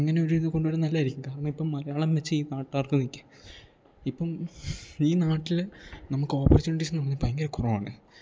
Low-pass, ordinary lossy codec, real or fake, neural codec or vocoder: none; none; real; none